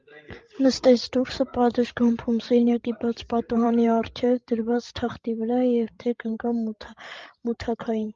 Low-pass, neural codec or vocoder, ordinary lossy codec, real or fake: 7.2 kHz; codec, 16 kHz, 16 kbps, FreqCodec, larger model; Opus, 32 kbps; fake